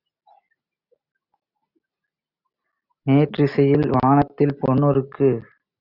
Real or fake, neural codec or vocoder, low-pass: real; none; 5.4 kHz